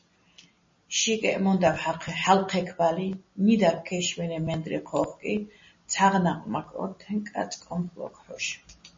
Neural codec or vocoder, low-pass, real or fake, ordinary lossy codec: none; 7.2 kHz; real; MP3, 32 kbps